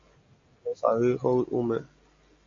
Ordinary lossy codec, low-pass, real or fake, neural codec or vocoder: MP3, 48 kbps; 7.2 kHz; real; none